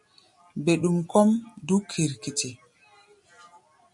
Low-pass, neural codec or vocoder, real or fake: 10.8 kHz; none; real